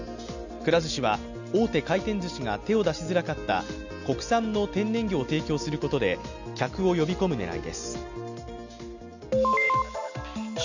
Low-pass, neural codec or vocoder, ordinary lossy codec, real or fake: 7.2 kHz; none; none; real